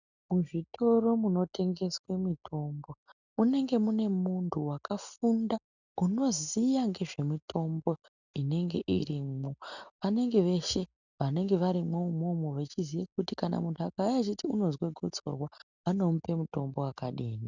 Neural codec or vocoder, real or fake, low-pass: none; real; 7.2 kHz